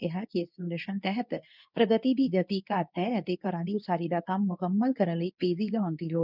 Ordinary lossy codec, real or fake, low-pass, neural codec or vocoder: none; fake; 5.4 kHz; codec, 24 kHz, 0.9 kbps, WavTokenizer, medium speech release version 2